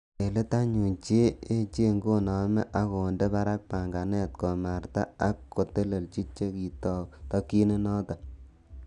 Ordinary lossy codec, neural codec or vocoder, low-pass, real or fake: none; none; 10.8 kHz; real